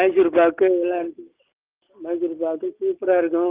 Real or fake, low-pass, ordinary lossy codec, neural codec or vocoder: real; 3.6 kHz; Opus, 24 kbps; none